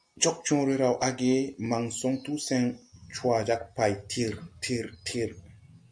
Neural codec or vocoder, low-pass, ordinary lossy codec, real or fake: none; 9.9 kHz; MP3, 96 kbps; real